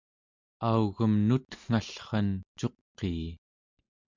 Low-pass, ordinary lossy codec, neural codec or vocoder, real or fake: 7.2 kHz; MP3, 64 kbps; none; real